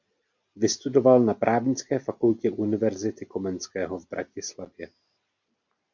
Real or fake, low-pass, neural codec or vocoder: real; 7.2 kHz; none